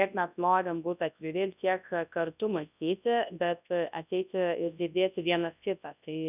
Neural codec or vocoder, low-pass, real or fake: codec, 24 kHz, 0.9 kbps, WavTokenizer, large speech release; 3.6 kHz; fake